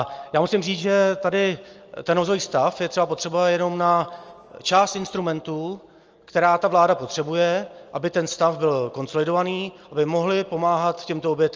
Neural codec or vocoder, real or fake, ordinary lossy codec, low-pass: none; real; Opus, 24 kbps; 7.2 kHz